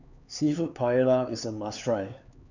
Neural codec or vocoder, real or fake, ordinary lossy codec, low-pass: codec, 16 kHz, 4 kbps, X-Codec, HuBERT features, trained on LibriSpeech; fake; none; 7.2 kHz